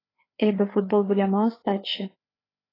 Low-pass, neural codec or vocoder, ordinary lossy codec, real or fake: 5.4 kHz; codec, 16 kHz, 4 kbps, FreqCodec, larger model; AAC, 24 kbps; fake